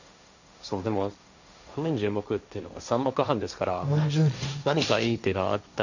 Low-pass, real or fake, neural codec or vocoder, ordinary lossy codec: 7.2 kHz; fake; codec, 16 kHz, 1.1 kbps, Voila-Tokenizer; none